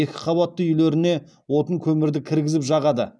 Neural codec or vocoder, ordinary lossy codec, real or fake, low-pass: none; none; real; none